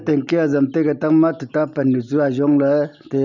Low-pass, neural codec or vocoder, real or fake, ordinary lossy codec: 7.2 kHz; none; real; none